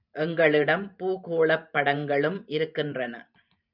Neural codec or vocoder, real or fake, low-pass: vocoder, 44.1 kHz, 128 mel bands every 512 samples, BigVGAN v2; fake; 5.4 kHz